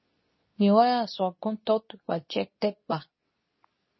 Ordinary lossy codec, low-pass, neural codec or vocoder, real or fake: MP3, 24 kbps; 7.2 kHz; codec, 24 kHz, 0.9 kbps, WavTokenizer, medium speech release version 2; fake